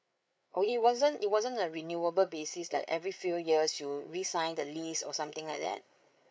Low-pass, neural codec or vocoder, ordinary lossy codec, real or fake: none; codec, 16 kHz, 8 kbps, FreqCodec, larger model; none; fake